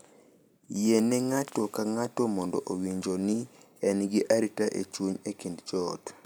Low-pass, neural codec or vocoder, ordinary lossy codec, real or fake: none; none; none; real